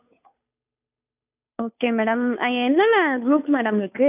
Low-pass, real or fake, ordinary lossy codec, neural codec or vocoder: 3.6 kHz; fake; none; codec, 16 kHz, 2 kbps, FunCodec, trained on Chinese and English, 25 frames a second